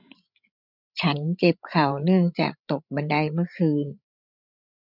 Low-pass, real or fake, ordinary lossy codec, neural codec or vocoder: 5.4 kHz; fake; none; codec, 16 kHz, 16 kbps, FreqCodec, larger model